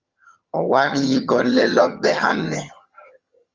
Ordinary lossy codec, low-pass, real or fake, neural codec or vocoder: Opus, 32 kbps; 7.2 kHz; fake; vocoder, 22.05 kHz, 80 mel bands, HiFi-GAN